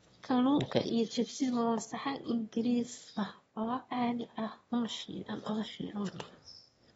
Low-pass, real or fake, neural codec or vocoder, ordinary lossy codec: 9.9 kHz; fake; autoencoder, 22.05 kHz, a latent of 192 numbers a frame, VITS, trained on one speaker; AAC, 24 kbps